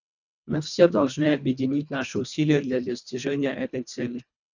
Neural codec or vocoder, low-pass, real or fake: codec, 24 kHz, 1.5 kbps, HILCodec; 7.2 kHz; fake